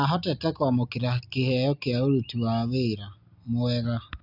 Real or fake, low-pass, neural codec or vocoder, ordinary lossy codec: real; 5.4 kHz; none; none